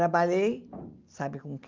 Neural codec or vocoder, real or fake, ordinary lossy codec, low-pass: none; real; Opus, 24 kbps; 7.2 kHz